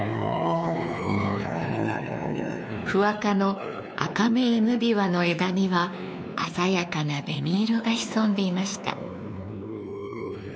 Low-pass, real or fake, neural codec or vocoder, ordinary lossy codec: none; fake; codec, 16 kHz, 2 kbps, X-Codec, WavLM features, trained on Multilingual LibriSpeech; none